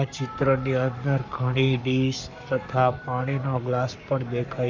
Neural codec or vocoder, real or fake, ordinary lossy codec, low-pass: codec, 44.1 kHz, 7.8 kbps, Pupu-Codec; fake; MP3, 64 kbps; 7.2 kHz